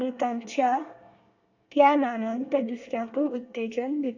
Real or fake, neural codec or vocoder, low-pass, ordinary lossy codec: fake; codec, 24 kHz, 1 kbps, SNAC; 7.2 kHz; AAC, 48 kbps